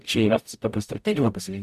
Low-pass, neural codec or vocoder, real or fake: 14.4 kHz; codec, 44.1 kHz, 0.9 kbps, DAC; fake